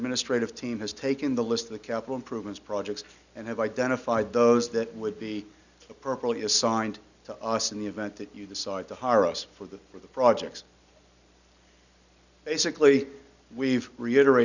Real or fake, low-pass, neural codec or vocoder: real; 7.2 kHz; none